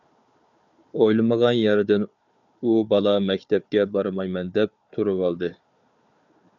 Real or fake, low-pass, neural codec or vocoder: fake; 7.2 kHz; codec, 16 kHz, 4 kbps, FunCodec, trained on Chinese and English, 50 frames a second